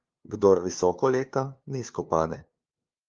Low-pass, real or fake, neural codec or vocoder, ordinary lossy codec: 7.2 kHz; fake; codec, 16 kHz, 2 kbps, FunCodec, trained on LibriTTS, 25 frames a second; Opus, 32 kbps